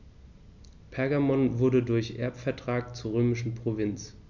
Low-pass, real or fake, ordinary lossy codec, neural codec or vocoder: 7.2 kHz; real; none; none